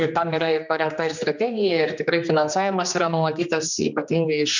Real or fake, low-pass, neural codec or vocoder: fake; 7.2 kHz; codec, 16 kHz, 2 kbps, X-Codec, HuBERT features, trained on general audio